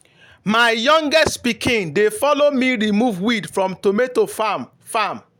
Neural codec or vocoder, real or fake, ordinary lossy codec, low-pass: none; real; none; 19.8 kHz